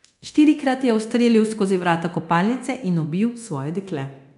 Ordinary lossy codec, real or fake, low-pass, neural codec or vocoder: none; fake; 10.8 kHz; codec, 24 kHz, 0.9 kbps, DualCodec